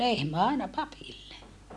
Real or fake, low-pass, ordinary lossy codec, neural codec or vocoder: real; none; none; none